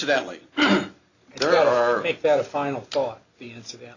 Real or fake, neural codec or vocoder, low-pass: real; none; 7.2 kHz